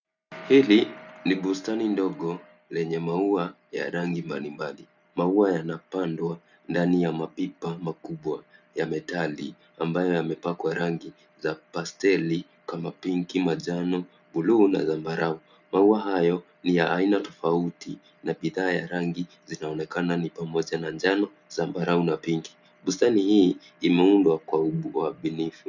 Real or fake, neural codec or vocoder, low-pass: real; none; 7.2 kHz